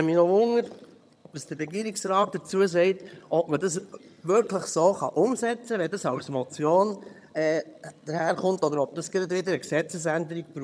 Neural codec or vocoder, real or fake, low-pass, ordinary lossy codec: vocoder, 22.05 kHz, 80 mel bands, HiFi-GAN; fake; none; none